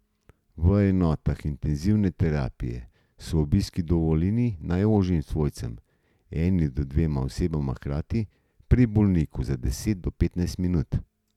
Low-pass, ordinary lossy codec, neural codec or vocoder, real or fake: 19.8 kHz; none; none; real